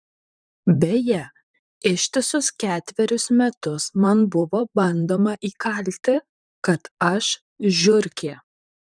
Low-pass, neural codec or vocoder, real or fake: 9.9 kHz; vocoder, 44.1 kHz, 128 mel bands, Pupu-Vocoder; fake